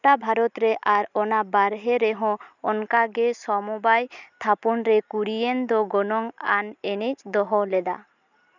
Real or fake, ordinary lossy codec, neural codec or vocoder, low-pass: real; none; none; 7.2 kHz